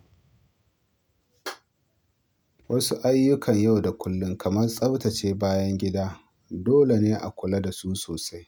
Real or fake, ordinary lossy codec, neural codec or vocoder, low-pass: real; none; none; none